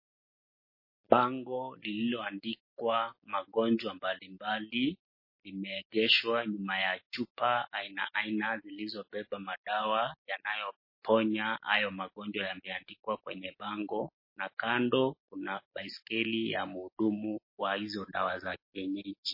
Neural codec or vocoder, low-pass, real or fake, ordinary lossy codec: none; 5.4 kHz; real; MP3, 24 kbps